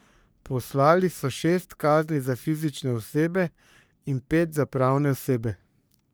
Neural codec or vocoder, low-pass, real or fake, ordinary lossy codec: codec, 44.1 kHz, 3.4 kbps, Pupu-Codec; none; fake; none